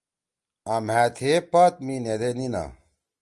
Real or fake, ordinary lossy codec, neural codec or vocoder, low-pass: real; Opus, 32 kbps; none; 10.8 kHz